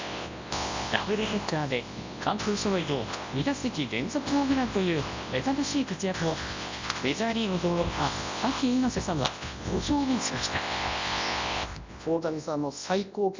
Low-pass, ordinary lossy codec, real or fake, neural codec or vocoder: 7.2 kHz; MP3, 64 kbps; fake; codec, 24 kHz, 0.9 kbps, WavTokenizer, large speech release